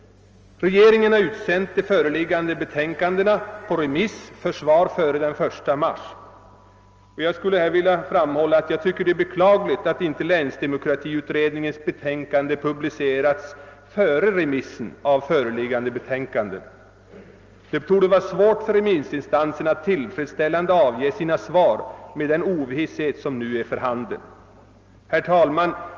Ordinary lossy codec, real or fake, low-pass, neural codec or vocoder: Opus, 24 kbps; real; 7.2 kHz; none